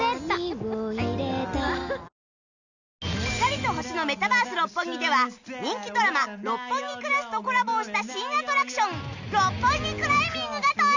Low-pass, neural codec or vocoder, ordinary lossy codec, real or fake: 7.2 kHz; none; none; real